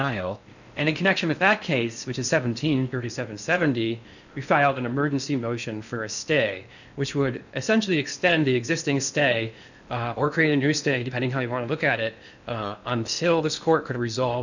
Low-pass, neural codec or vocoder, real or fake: 7.2 kHz; codec, 16 kHz in and 24 kHz out, 0.6 kbps, FocalCodec, streaming, 4096 codes; fake